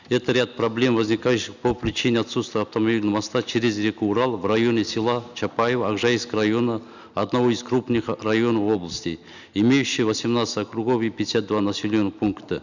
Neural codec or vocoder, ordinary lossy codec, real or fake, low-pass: none; none; real; 7.2 kHz